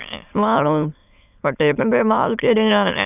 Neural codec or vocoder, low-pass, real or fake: autoencoder, 22.05 kHz, a latent of 192 numbers a frame, VITS, trained on many speakers; 3.6 kHz; fake